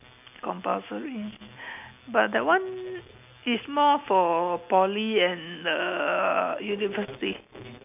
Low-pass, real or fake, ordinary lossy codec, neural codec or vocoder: 3.6 kHz; real; none; none